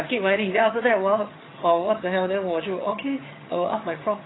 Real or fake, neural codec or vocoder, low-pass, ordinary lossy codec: fake; vocoder, 22.05 kHz, 80 mel bands, HiFi-GAN; 7.2 kHz; AAC, 16 kbps